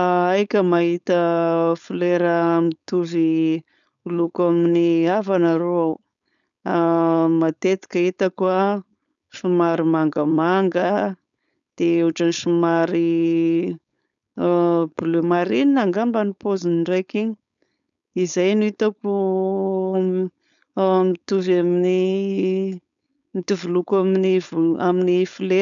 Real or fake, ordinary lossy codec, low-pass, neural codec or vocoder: fake; none; 7.2 kHz; codec, 16 kHz, 4.8 kbps, FACodec